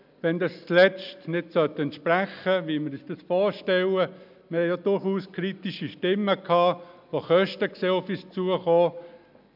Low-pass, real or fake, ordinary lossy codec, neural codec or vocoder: 5.4 kHz; real; none; none